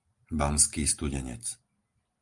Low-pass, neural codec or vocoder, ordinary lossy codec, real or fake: 10.8 kHz; none; Opus, 32 kbps; real